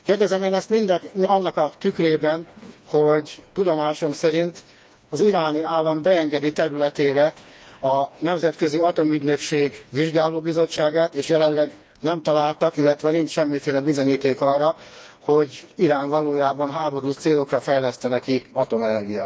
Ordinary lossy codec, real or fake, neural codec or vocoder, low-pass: none; fake; codec, 16 kHz, 2 kbps, FreqCodec, smaller model; none